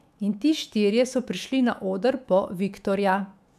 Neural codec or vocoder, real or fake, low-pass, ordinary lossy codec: autoencoder, 48 kHz, 128 numbers a frame, DAC-VAE, trained on Japanese speech; fake; 14.4 kHz; none